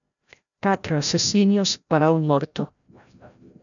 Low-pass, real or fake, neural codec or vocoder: 7.2 kHz; fake; codec, 16 kHz, 0.5 kbps, FreqCodec, larger model